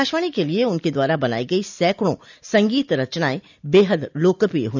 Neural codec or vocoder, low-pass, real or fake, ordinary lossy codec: none; 7.2 kHz; real; none